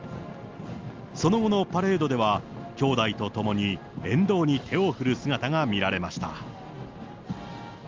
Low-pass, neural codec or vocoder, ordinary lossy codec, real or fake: 7.2 kHz; none; Opus, 24 kbps; real